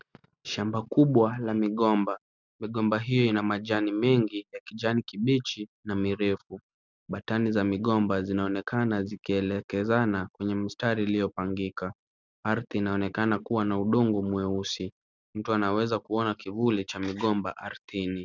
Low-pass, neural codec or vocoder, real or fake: 7.2 kHz; none; real